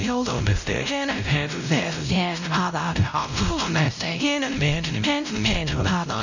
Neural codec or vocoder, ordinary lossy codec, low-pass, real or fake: codec, 16 kHz, 0.5 kbps, X-Codec, WavLM features, trained on Multilingual LibriSpeech; none; 7.2 kHz; fake